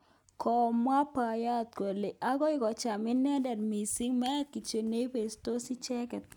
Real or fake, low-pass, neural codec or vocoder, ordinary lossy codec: real; 19.8 kHz; none; none